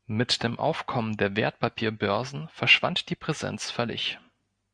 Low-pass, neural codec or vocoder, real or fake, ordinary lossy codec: 9.9 kHz; none; real; Opus, 64 kbps